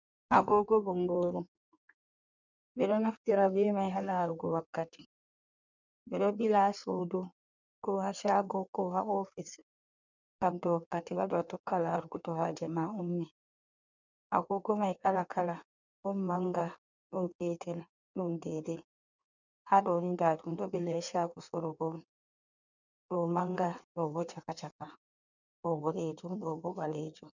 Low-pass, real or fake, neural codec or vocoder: 7.2 kHz; fake; codec, 16 kHz in and 24 kHz out, 1.1 kbps, FireRedTTS-2 codec